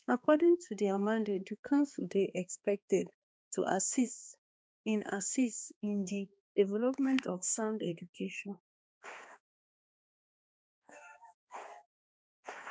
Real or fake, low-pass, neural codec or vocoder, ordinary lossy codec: fake; none; codec, 16 kHz, 2 kbps, X-Codec, HuBERT features, trained on balanced general audio; none